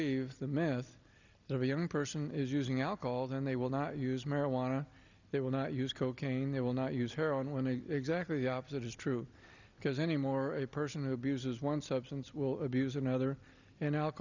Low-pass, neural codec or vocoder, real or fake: 7.2 kHz; none; real